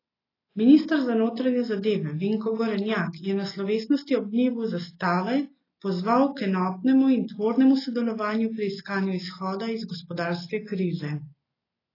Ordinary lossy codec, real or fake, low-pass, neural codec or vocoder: AAC, 24 kbps; real; 5.4 kHz; none